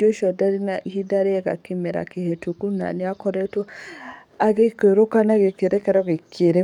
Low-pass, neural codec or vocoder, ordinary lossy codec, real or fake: 19.8 kHz; codec, 44.1 kHz, 7.8 kbps, DAC; none; fake